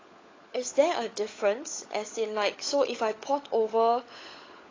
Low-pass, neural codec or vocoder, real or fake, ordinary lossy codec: 7.2 kHz; codec, 16 kHz, 16 kbps, FunCodec, trained on LibriTTS, 50 frames a second; fake; AAC, 32 kbps